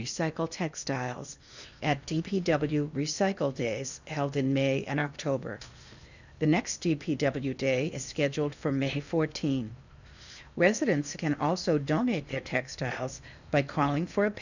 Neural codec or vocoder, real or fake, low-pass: codec, 16 kHz in and 24 kHz out, 0.8 kbps, FocalCodec, streaming, 65536 codes; fake; 7.2 kHz